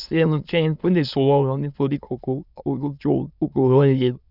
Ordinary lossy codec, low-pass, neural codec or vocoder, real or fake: none; 5.4 kHz; autoencoder, 22.05 kHz, a latent of 192 numbers a frame, VITS, trained on many speakers; fake